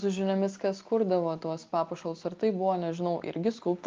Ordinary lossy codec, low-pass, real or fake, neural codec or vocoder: Opus, 32 kbps; 7.2 kHz; real; none